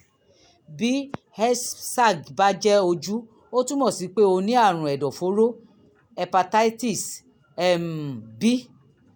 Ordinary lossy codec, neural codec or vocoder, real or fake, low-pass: none; none; real; none